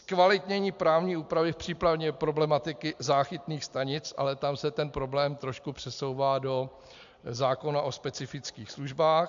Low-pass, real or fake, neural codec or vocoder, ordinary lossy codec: 7.2 kHz; real; none; AAC, 64 kbps